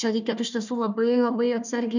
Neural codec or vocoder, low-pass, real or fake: codec, 16 kHz, 1 kbps, FunCodec, trained on Chinese and English, 50 frames a second; 7.2 kHz; fake